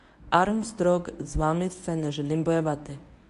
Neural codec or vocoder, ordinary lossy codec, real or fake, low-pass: codec, 24 kHz, 0.9 kbps, WavTokenizer, medium speech release version 1; AAC, 64 kbps; fake; 10.8 kHz